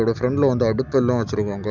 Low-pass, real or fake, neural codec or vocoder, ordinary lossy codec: 7.2 kHz; real; none; none